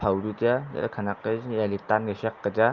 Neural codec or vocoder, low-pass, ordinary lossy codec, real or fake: none; 7.2 kHz; Opus, 24 kbps; real